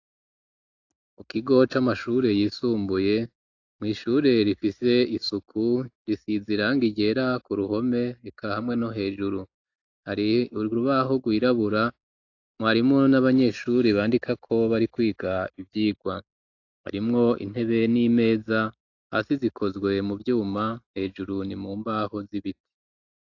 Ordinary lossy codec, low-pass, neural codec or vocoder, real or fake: AAC, 48 kbps; 7.2 kHz; none; real